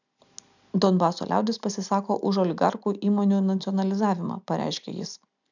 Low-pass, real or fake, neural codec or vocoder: 7.2 kHz; real; none